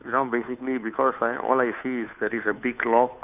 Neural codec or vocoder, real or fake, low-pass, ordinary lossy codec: codec, 16 kHz, 2 kbps, FunCodec, trained on Chinese and English, 25 frames a second; fake; 3.6 kHz; none